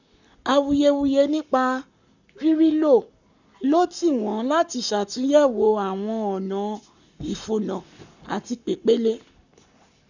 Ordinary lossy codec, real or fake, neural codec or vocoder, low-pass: none; fake; codec, 44.1 kHz, 7.8 kbps, Pupu-Codec; 7.2 kHz